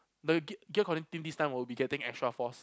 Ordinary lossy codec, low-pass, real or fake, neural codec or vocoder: none; none; real; none